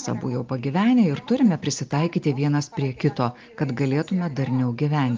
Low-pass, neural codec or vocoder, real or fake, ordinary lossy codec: 7.2 kHz; none; real; Opus, 32 kbps